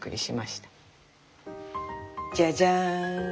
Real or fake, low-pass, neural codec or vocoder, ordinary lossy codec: real; none; none; none